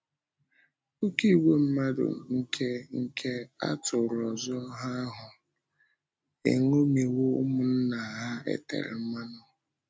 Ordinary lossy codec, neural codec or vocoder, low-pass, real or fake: none; none; none; real